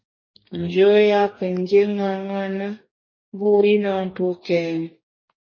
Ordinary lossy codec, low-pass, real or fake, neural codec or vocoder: MP3, 32 kbps; 7.2 kHz; fake; codec, 44.1 kHz, 2.6 kbps, DAC